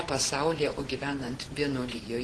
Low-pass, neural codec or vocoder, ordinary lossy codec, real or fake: 10.8 kHz; vocoder, 24 kHz, 100 mel bands, Vocos; Opus, 16 kbps; fake